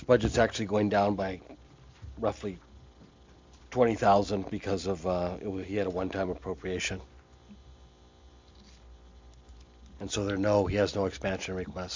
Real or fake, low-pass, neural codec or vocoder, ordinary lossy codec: real; 7.2 kHz; none; MP3, 64 kbps